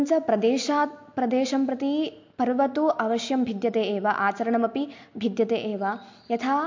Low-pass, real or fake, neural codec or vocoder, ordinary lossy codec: 7.2 kHz; real; none; MP3, 48 kbps